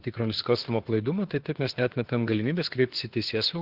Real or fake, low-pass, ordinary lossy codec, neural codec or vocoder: fake; 5.4 kHz; Opus, 16 kbps; autoencoder, 48 kHz, 32 numbers a frame, DAC-VAE, trained on Japanese speech